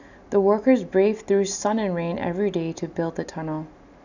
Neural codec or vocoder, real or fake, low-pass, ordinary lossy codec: none; real; 7.2 kHz; none